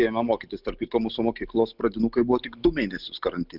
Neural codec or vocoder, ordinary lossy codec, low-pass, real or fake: none; Opus, 24 kbps; 5.4 kHz; real